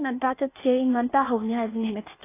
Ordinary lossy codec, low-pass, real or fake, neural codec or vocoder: AAC, 16 kbps; 3.6 kHz; fake; codec, 16 kHz, 0.8 kbps, ZipCodec